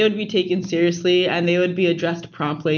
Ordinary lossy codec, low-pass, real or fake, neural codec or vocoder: MP3, 64 kbps; 7.2 kHz; real; none